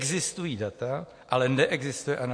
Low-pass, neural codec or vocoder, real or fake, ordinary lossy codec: 9.9 kHz; none; real; MP3, 48 kbps